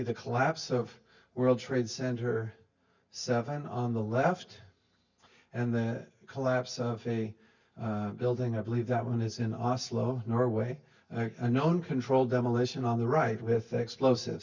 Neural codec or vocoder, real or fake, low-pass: none; real; 7.2 kHz